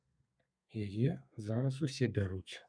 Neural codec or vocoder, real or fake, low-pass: codec, 32 kHz, 1.9 kbps, SNAC; fake; 9.9 kHz